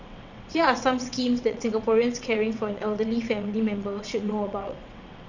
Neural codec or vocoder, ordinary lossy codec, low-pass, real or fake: vocoder, 22.05 kHz, 80 mel bands, WaveNeXt; none; 7.2 kHz; fake